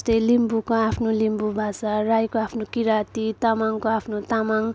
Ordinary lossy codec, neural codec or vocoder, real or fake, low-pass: none; none; real; none